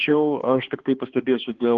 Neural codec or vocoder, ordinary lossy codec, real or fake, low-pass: codec, 16 kHz, 2 kbps, X-Codec, HuBERT features, trained on balanced general audio; Opus, 16 kbps; fake; 7.2 kHz